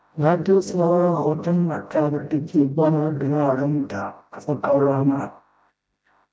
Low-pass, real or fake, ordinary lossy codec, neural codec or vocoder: none; fake; none; codec, 16 kHz, 0.5 kbps, FreqCodec, smaller model